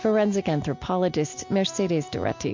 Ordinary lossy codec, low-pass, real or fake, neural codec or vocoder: MP3, 48 kbps; 7.2 kHz; real; none